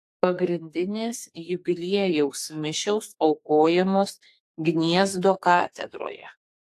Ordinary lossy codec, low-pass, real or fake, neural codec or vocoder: AAC, 64 kbps; 14.4 kHz; fake; codec, 32 kHz, 1.9 kbps, SNAC